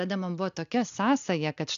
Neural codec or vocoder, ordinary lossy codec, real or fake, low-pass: none; AAC, 96 kbps; real; 7.2 kHz